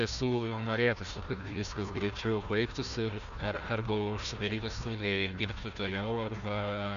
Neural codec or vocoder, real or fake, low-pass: codec, 16 kHz, 1 kbps, FunCodec, trained on Chinese and English, 50 frames a second; fake; 7.2 kHz